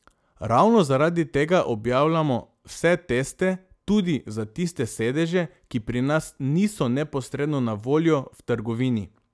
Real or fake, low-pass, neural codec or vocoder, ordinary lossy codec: real; none; none; none